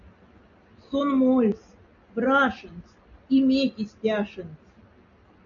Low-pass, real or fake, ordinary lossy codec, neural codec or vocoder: 7.2 kHz; real; MP3, 96 kbps; none